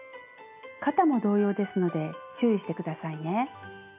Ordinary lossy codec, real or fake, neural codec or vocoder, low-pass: AAC, 32 kbps; real; none; 3.6 kHz